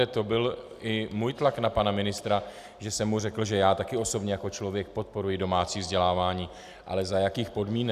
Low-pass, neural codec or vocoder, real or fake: 14.4 kHz; none; real